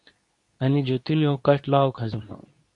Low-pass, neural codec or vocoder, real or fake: 10.8 kHz; codec, 24 kHz, 0.9 kbps, WavTokenizer, medium speech release version 2; fake